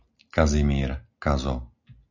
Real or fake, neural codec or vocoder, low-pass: real; none; 7.2 kHz